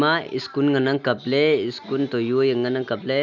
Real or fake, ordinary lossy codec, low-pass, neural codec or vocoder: real; none; 7.2 kHz; none